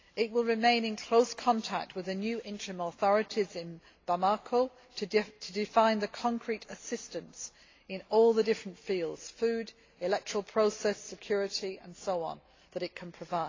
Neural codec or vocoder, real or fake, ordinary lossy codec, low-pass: none; real; AAC, 32 kbps; 7.2 kHz